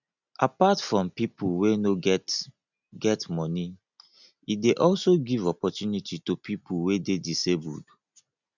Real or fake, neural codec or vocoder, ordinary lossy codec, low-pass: real; none; none; 7.2 kHz